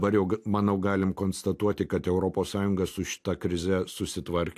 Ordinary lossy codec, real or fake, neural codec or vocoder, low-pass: AAC, 64 kbps; real; none; 14.4 kHz